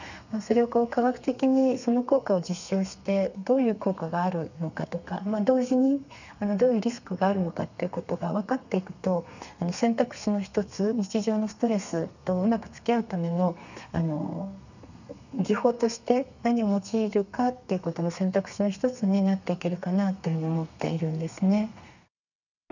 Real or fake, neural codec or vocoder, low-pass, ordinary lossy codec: fake; codec, 32 kHz, 1.9 kbps, SNAC; 7.2 kHz; none